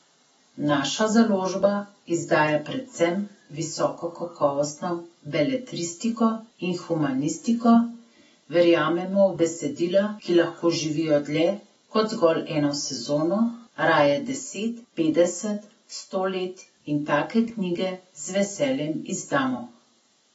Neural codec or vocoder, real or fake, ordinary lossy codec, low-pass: none; real; AAC, 24 kbps; 19.8 kHz